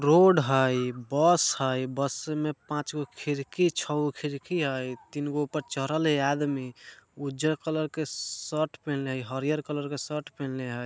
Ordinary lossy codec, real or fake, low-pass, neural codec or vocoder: none; real; none; none